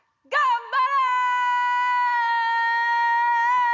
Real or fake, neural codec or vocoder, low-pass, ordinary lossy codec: real; none; 7.2 kHz; none